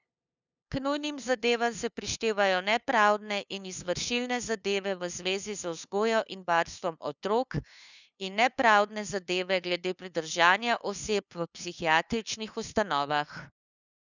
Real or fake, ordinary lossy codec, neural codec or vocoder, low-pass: fake; none; codec, 16 kHz, 2 kbps, FunCodec, trained on LibriTTS, 25 frames a second; 7.2 kHz